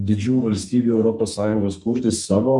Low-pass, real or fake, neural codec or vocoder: 10.8 kHz; fake; codec, 32 kHz, 1.9 kbps, SNAC